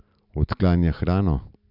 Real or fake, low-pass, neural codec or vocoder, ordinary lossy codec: real; 5.4 kHz; none; none